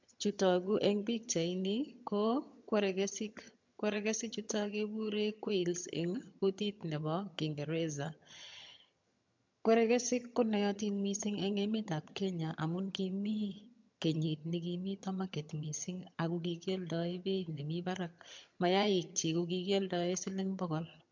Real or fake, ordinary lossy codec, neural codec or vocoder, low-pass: fake; MP3, 64 kbps; vocoder, 22.05 kHz, 80 mel bands, HiFi-GAN; 7.2 kHz